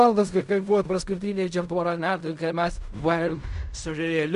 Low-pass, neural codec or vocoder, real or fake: 10.8 kHz; codec, 16 kHz in and 24 kHz out, 0.4 kbps, LongCat-Audio-Codec, fine tuned four codebook decoder; fake